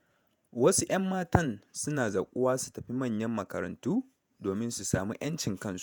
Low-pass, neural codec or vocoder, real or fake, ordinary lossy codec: none; vocoder, 48 kHz, 128 mel bands, Vocos; fake; none